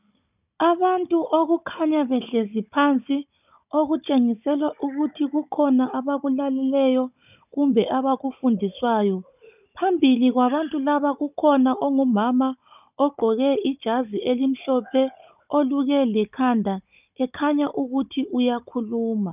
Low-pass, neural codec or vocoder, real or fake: 3.6 kHz; codec, 16 kHz, 16 kbps, FunCodec, trained on Chinese and English, 50 frames a second; fake